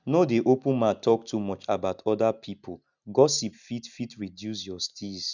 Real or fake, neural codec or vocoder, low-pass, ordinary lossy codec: real; none; 7.2 kHz; none